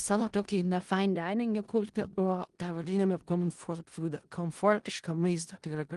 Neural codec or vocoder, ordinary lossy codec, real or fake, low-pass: codec, 16 kHz in and 24 kHz out, 0.4 kbps, LongCat-Audio-Codec, four codebook decoder; Opus, 24 kbps; fake; 10.8 kHz